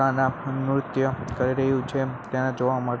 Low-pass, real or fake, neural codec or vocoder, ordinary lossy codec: none; real; none; none